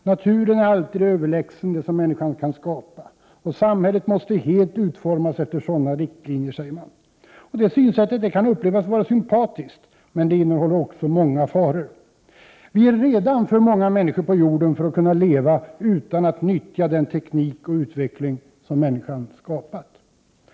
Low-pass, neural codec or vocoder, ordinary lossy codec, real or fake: none; none; none; real